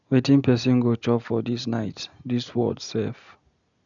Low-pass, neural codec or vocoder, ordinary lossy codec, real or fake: 7.2 kHz; none; none; real